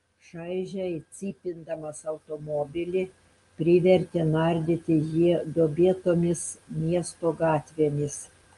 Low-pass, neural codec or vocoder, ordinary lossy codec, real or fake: 10.8 kHz; none; Opus, 32 kbps; real